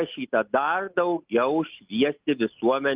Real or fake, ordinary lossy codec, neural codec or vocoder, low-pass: real; Opus, 32 kbps; none; 3.6 kHz